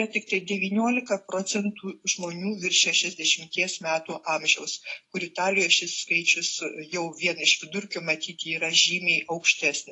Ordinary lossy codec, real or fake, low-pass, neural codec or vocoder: AAC, 48 kbps; real; 10.8 kHz; none